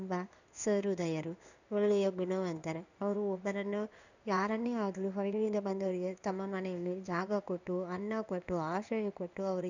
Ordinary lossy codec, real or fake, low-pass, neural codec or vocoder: none; fake; 7.2 kHz; codec, 16 kHz in and 24 kHz out, 1 kbps, XY-Tokenizer